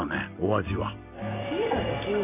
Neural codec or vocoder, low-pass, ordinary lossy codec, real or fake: none; 3.6 kHz; none; real